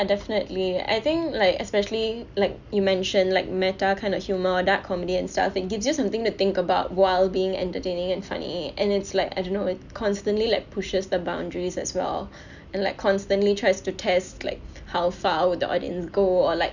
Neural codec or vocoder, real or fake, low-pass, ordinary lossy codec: none; real; 7.2 kHz; none